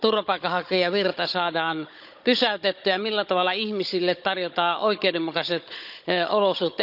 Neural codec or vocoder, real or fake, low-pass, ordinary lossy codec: codec, 16 kHz, 16 kbps, FunCodec, trained on Chinese and English, 50 frames a second; fake; 5.4 kHz; none